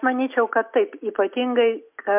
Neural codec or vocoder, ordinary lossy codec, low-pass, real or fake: none; MP3, 32 kbps; 3.6 kHz; real